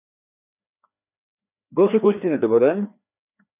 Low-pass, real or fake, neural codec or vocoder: 3.6 kHz; fake; codec, 16 kHz, 2 kbps, FreqCodec, larger model